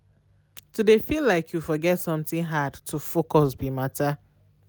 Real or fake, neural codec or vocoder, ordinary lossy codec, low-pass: real; none; none; none